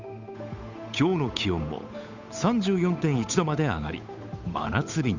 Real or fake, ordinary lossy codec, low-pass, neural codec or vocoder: fake; none; 7.2 kHz; codec, 16 kHz, 8 kbps, FunCodec, trained on Chinese and English, 25 frames a second